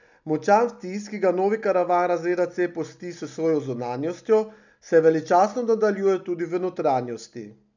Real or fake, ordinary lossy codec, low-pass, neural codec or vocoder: real; none; 7.2 kHz; none